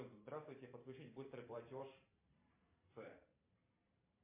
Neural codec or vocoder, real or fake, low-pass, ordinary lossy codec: vocoder, 44.1 kHz, 128 mel bands every 512 samples, BigVGAN v2; fake; 3.6 kHz; MP3, 24 kbps